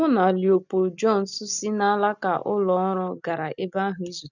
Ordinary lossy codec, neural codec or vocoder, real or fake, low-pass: none; none; real; 7.2 kHz